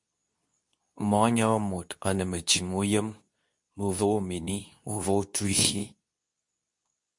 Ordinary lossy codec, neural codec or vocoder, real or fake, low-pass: MP3, 64 kbps; codec, 24 kHz, 0.9 kbps, WavTokenizer, medium speech release version 2; fake; 10.8 kHz